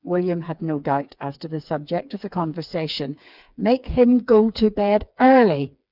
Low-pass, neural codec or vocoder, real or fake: 5.4 kHz; codec, 16 kHz, 4 kbps, FreqCodec, smaller model; fake